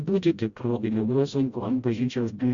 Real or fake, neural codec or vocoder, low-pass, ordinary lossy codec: fake; codec, 16 kHz, 0.5 kbps, FreqCodec, smaller model; 7.2 kHz; Opus, 64 kbps